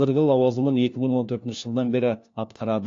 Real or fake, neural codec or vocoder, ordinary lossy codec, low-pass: fake; codec, 16 kHz, 1 kbps, FunCodec, trained on LibriTTS, 50 frames a second; AAC, 48 kbps; 7.2 kHz